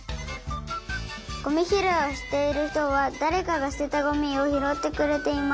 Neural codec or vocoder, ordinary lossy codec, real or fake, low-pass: none; none; real; none